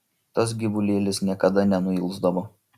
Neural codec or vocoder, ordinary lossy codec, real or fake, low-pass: none; Opus, 64 kbps; real; 14.4 kHz